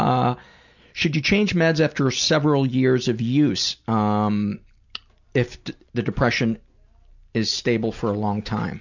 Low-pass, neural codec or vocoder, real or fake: 7.2 kHz; none; real